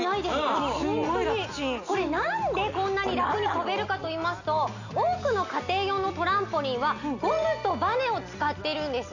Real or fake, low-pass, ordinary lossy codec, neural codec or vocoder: real; 7.2 kHz; none; none